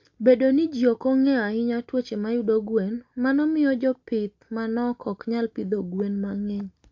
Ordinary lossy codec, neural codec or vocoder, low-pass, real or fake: AAC, 48 kbps; none; 7.2 kHz; real